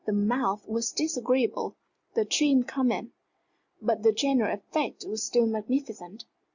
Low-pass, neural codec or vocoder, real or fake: 7.2 kHz; none; real